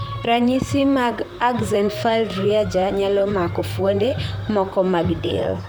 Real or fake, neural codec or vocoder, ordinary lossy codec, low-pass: fake; vocoder, 44.1 kHz, 128 mel bands, Pupu-Vocoder; none; none